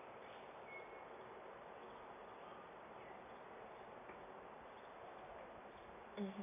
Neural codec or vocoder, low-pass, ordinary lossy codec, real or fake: none; 3.6 kHz; MP3, 24 kbps; real